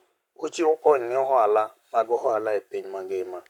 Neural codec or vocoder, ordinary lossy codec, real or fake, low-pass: codec, 44.1 kHz, 7.8 kbps, Pupu-Codec; none; fake; 19.8 kHz